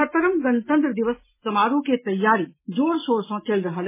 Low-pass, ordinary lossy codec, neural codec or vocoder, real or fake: 3.6 kHz; MP3, 16 kbps; none; real